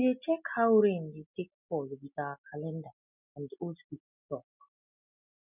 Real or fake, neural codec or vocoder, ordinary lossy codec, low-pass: real; none; none; 3.6 kHz